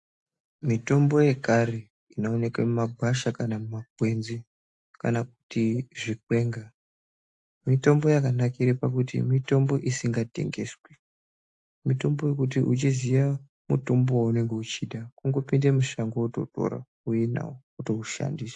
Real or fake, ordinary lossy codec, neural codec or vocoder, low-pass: real; AAC, 64 kbps; none; 10.8 kHz